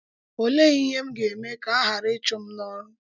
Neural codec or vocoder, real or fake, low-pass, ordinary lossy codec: none; real; 7.2 kHz; none